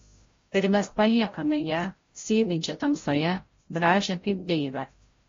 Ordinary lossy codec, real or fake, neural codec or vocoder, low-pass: AAC, 32 kbps; fake; codec, 16 kHz, 0.5 kbps, FreqCodec, larger model; 7.2 kHz